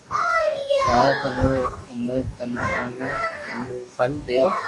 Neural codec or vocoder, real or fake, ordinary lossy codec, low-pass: codec, 44.1 kHz, 2.6 kbps, DAC; fake; AAC, 64 kbps; 10.8 kHz